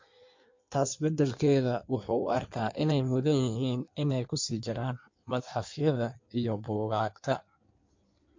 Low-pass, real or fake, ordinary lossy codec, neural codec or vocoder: 7.2 kHz; fake; MP3, 48 kbps; codec, 16 kHz in and 24 kHz out, 1.1 kbps, FireRedTTS-2 codec